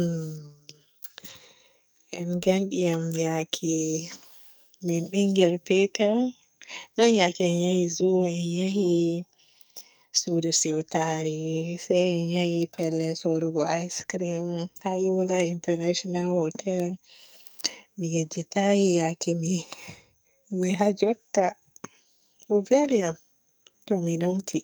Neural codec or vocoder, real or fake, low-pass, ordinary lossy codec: codec, 44.1 kHz, 2.6 kbps, SNAC; fake; none; none